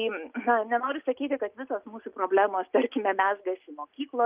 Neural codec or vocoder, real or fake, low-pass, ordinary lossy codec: none; real; 3.6 kHz; Opus, 24 kbps